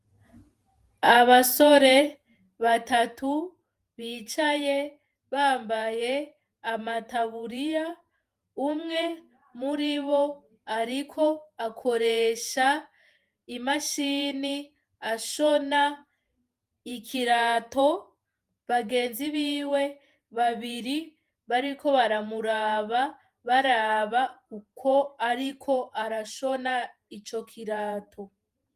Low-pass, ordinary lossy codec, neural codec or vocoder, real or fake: 14.4 kHz; Opus, 32 kbps; vocoder, 48 kHz, 128 mel bands, Vocos; fake